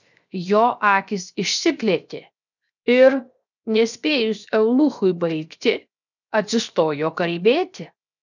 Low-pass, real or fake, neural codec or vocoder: 7.2 kHz; fake; codec, 16 kHz, 0.7 kbps, FocalCodec